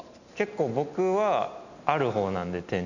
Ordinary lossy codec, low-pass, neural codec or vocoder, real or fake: none; 7.2 kHz; none; real